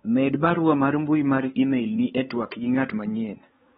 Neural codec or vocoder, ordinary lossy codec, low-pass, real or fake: codec, 16 kHz, 4 kbps, X-Codec, WavLM features, trained on Multilingual LibriSpeech; AAC, 16 kbps; 7.2 kHz; fake